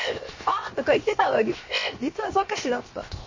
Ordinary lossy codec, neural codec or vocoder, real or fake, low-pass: MP3, 32 kbps; codec, 16 kHz, 0.7 kbps, FocalCodec; fake; 7.2 kHz